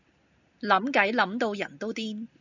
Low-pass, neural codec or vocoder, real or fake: 7.2 kHz; none; real